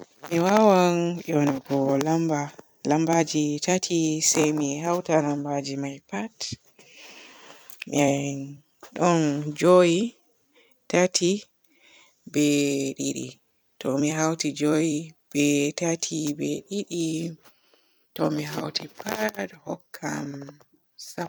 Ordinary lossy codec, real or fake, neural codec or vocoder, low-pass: none; fake; vocoder, 44.1 kHz, 128 mel bands every 256 samples, BigVGAN v2; none